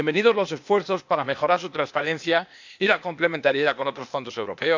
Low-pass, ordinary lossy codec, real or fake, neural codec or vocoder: 7.2 kHz; MP3, 64 kbps; fake; codec, 16 kHz, 0.8 kbps, ZipCodec